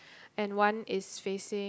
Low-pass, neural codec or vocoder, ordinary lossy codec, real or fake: none; none; none; real